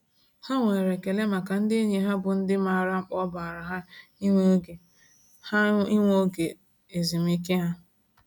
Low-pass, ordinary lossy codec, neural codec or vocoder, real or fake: none; none; none; real